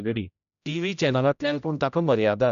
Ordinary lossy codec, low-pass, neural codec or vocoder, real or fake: none; 7.2 kHz; codec, 16 kHz, 0.5 kbps, X-Codec, HuBERT features, trained on general audio; fake